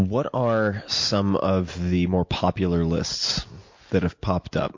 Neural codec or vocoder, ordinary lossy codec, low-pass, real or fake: none; MP3, 48 kbps; 7.2 kHz; real